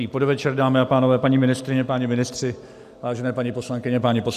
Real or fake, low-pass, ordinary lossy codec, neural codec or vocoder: real; 14.4 kHz; AAC, 96 kbps; none